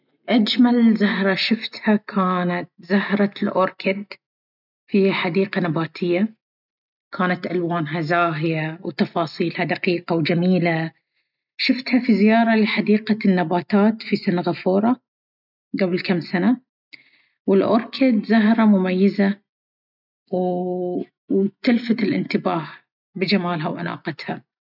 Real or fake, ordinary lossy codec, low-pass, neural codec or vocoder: real; none; 5.4 kHz; none